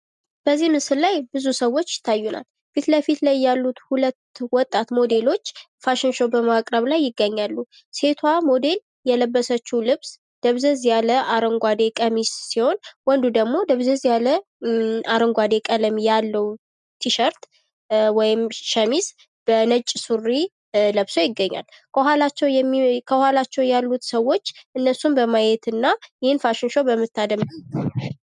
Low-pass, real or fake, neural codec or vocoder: 10.8 kHz; real; none